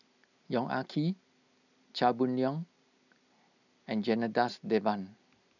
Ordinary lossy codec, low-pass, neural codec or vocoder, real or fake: none; 7.2 kHz; none; real